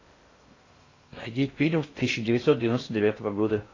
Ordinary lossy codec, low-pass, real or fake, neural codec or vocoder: AAC, 32 kbps; 7.2 kHz; fake; codec, 16 kHz in and 24 kHz out, 0.6 kbps, FocalCodec, streaming, 2048 codes